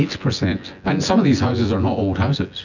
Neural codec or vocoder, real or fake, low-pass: vocoder, 24 kHz, 100 mel bands, Vocos; fake; 7.2 kHz